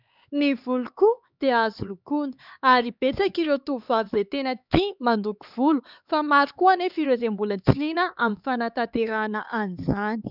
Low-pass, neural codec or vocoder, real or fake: 5.4 kHz; codec, 16 kHz, 4 kbps, X-Codec, HuBERT features, trained on LibriSpeech; fake